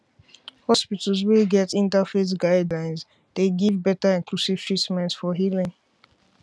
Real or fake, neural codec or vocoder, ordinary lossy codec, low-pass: real; none; none; none